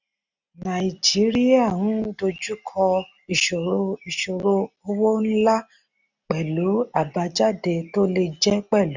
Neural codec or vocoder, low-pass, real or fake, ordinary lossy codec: none; 7.2 kHz; real; none